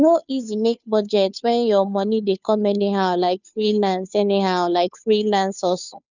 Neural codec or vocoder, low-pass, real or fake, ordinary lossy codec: codec, 16 kHz, 2 kbps, FunCodec, trained on Chinese and English, 25 frames a second; 7.2 kHz; fake; none